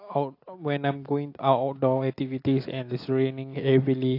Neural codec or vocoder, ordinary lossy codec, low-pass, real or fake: none; AAC, 32 kbps; 5.4 kHz; real